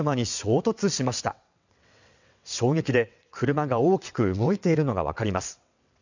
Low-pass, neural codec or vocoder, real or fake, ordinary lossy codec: 7.2 kHz; vocoder, 22.05 kHz, 80 mel bands, WaveNeXt; fake; none